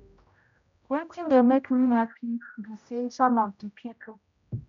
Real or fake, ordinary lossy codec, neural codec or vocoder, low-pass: fake; MP3, 64 kbps; codec, 16 kHz, 0.5 kbps, X-Codec, HuBERT features, trained on general audio; 7.2 kHz